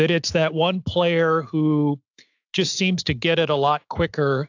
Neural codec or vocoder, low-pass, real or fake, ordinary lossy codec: autoencoder, 48 kHz, 128 numbers a frame, DAC-VAE, trained on Japanese speech; 7.2 kHz; fake; AAC, 48 kbps